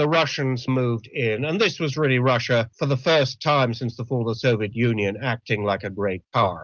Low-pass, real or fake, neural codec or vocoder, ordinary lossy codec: 7.2 kHz; real; none; Opus, 24 kbps